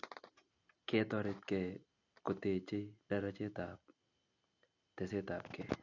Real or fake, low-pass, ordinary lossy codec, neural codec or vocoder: real; 7.2 kHz; none; none